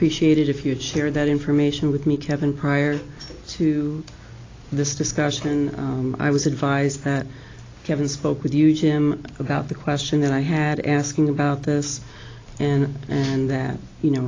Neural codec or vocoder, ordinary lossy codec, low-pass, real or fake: none; AAC, 32 kbps; 7.2 kHz; real